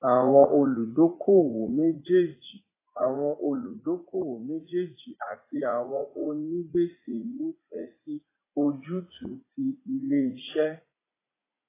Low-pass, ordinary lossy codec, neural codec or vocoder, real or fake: 3.6 kHz; AAC, 16 kbps; vocoder, 44.1 kHz, 80 mel bands, Vocos; fake